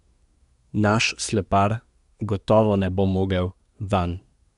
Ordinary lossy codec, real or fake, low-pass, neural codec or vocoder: none; fake; 10.8 kHz; codec, 24 kHz, 1 kbps, SNAC